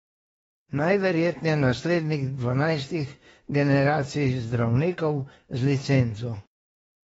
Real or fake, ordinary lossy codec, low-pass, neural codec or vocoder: fake; AAC, 24 kbps; 19.8 kHz; autoencoder, 48 kHz, 32 numbers a frame, DAC-VAE, trained on Japanese speech